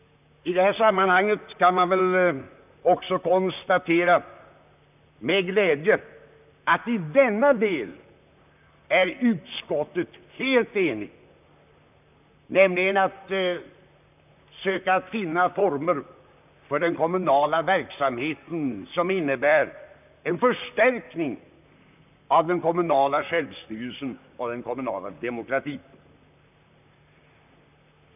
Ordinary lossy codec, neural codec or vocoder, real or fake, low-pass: none; vocoder, 44.1 kHz, 128 mel bands, Pupu-Vocoder; fake; 3.6 kHz